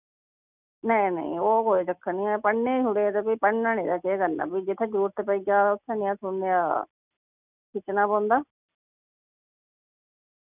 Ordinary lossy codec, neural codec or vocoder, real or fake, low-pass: none; none; real; 3.6 kHz